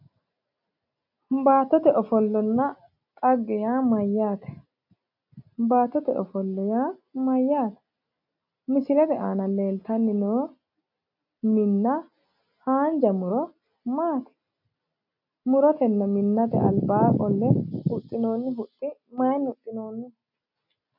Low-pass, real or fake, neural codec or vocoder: 5.4 kHz; real; none